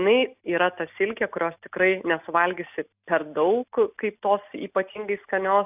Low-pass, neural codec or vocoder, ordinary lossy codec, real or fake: 3.6 kHz; none; AAC, 32 kbps; real